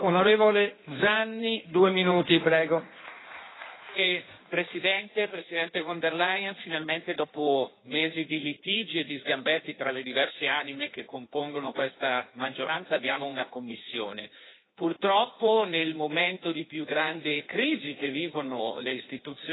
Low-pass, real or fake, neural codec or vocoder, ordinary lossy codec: 7.2 kHz; fake; codec, 16 kHz in and 24 kHz out, 1.1 kbps, FireRedTTS-2 codec; AAC, 16 kbps